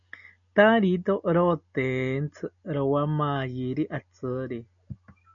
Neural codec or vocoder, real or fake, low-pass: none; real; 7.2 kHz